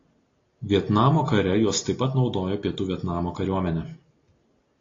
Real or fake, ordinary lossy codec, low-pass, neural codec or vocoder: real; AAC, 32 kbps; 7.2 kHz; none